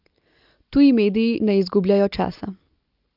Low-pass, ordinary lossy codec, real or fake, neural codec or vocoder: 5.4 kHz; Opus, 32 kbps; real; none